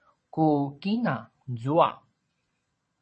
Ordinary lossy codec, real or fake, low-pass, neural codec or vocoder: MP3, 32 kbps; fake; 9.9 kHz; vocoder, 22.05 kHz, 80 mel bands, Vocos